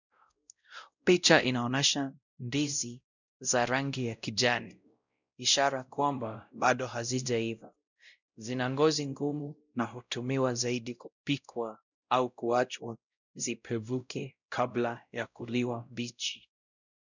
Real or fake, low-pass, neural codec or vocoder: fake; 7.2 kHz; codec, 16 kHz, 0.5 kbps, X-Codec, WavLM features, trained on Multilingual LibriSpeech